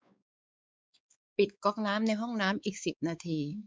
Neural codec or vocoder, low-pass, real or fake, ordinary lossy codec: codec, 16 kHz, 4 kbps, X-Codec, WavLM features, trained on Multilingual LibriSpeech; none; fake; none